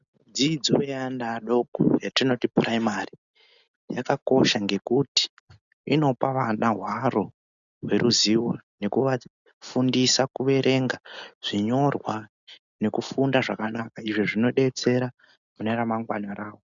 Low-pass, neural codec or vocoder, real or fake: 7.2 kHz; none; real